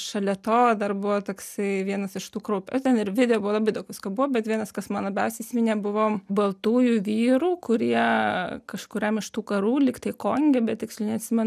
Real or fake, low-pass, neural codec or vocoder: real; 14.4 kHz; none